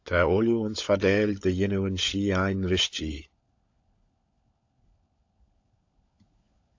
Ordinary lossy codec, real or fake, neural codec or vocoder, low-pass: AAC, 48 kbps; fake; codec, 16 kHz, 16 kbps, FunCodec, trained on LibriTTS, 50 frames a second; 7.2 kHz